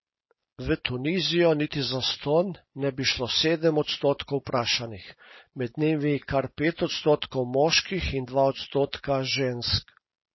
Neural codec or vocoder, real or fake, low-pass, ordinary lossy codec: none; real; 7.2 kHz; MP3, 24 kbps